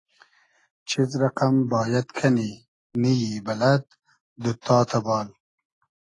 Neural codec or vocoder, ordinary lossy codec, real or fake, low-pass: none; AAC, 32 kbps; real; 10.8 kHz